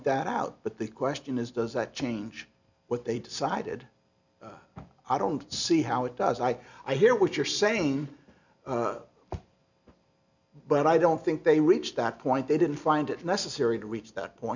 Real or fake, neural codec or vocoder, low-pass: real; none; 7.2 kHz